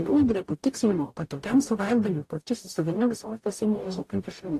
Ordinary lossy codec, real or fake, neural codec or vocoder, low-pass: AAC, 64 kbps; fake; codec, 44.1 kHz, 0.9 kbps, DAC; 14.4 kHz